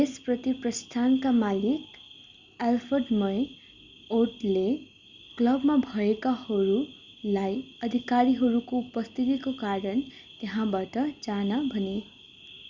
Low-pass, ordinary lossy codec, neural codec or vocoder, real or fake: 7.2 kHz; Opus, 64 kbps; none; real